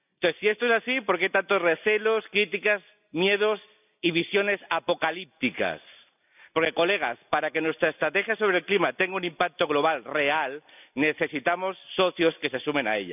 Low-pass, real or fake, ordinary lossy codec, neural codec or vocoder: 3.6 kHz; real; none; none